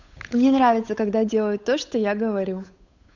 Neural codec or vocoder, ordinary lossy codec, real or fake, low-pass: codec, 16 kHz, 8 kbps, FunCodec, trained on Chinese and English, 25 frames a second; none; fake; 7.2 kHz